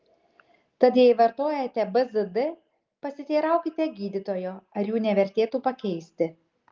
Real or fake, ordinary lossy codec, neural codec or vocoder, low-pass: real; Opus, 32 kbps; none; 7.2 kHz